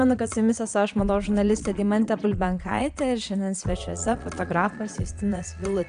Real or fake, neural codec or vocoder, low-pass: fake; vocoder, 22.05 kHz, 80 mel bands, Vocos; 9.9 kHz